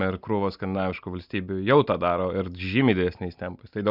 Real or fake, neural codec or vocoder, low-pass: real; none; 5.4 kHz